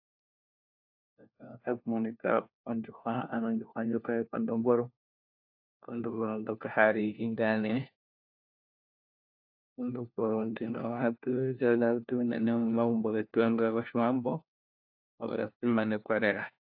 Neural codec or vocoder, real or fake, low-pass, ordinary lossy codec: codec, 16 kHz, 1 kbps, FunCodec, trained on LibriTTS, 50 frames a second; fake; 5.4 kHz; AAC, 48 kbps